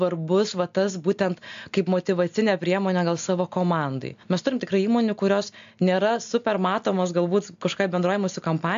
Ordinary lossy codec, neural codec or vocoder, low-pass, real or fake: AAC, 64 kbps; none; 7.2 kHz; real